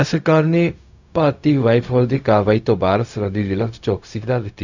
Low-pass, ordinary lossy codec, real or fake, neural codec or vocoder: 7.2 kHz; none; fake; codec, 16 kHz, 0.4 kbps, LongCat-Audio-Codec